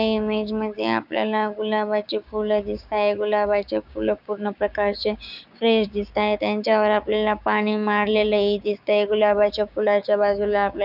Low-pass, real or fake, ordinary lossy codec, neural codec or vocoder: 5.4 kHz; fake; none; codec, 16 kHz, 6 kbps, DAC